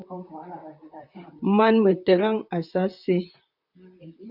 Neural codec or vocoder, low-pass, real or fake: vocoder, 44.1 kHz, 128 mel bands, Pupu-Vocoder; 5.4 kHz; fake